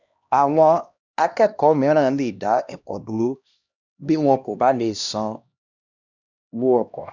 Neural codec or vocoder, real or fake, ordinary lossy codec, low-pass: codec, 16 kHz, 1 kbps, X-Codec, HuBERT features, trained on LibriSpeech; fake; none; 7.2 kHz